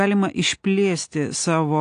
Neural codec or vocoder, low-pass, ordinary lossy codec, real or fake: none; 9.9 kHz; AAC, 48 kbps; real